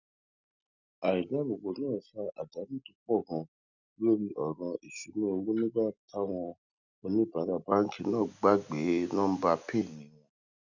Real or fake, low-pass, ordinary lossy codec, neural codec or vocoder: real; 7.2 kHz; none; none